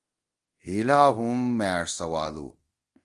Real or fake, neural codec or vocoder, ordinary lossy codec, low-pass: fake; codec, 24 kHz, 0.5 kbps, DualCodec; Opus, 24 kbps; 10.8 kHz